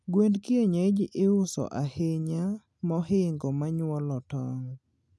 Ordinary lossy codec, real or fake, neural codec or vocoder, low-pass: none; real; none; none